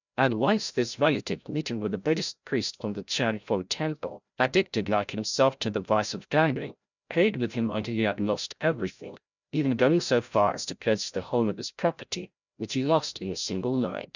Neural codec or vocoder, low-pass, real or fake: codec, 16 kHz, 0.5 kbps, FreqCodec, larger model; 7.2 kHz; fake